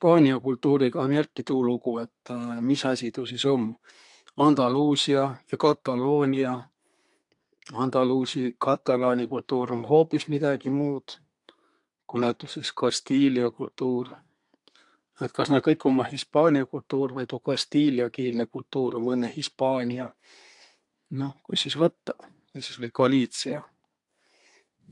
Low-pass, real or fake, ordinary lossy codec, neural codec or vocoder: 10.8 kHz; fake; AAC, 64 kbps; codec, 24 kHz, 1 kbps, SNAC